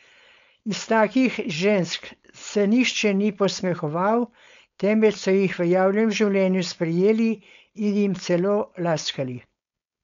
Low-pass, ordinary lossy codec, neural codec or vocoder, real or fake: 7.2 kHz; MP3, 64 kbps; codec, 16 kHz, 4.8 kbps, FACodec; fake